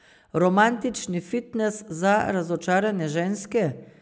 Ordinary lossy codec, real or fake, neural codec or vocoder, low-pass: none; real; none; none